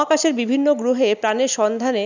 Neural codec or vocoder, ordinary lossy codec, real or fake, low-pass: none; none; real; 7.2 kHz